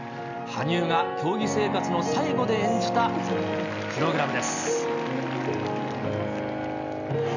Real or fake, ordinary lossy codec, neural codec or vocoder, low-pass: real; none; none; 7.2 kHz